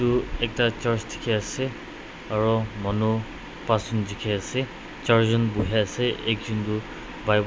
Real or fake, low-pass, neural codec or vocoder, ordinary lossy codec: real; none; none; none